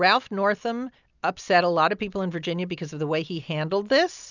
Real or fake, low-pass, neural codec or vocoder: real; 7.2 kHz; none